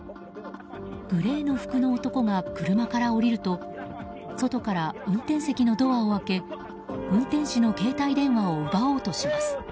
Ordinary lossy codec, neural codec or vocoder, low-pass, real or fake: none; none; none; real